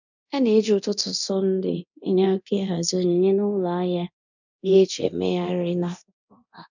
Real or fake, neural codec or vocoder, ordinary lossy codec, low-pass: fake; codec, 24 kHz, 0.9 kbps, DualCodec; none; 7.2 kHz